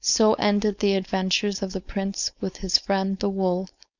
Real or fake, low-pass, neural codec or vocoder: fake; 7.2 kHz; codec, 16 kHz, 4.8 kbps, FACodec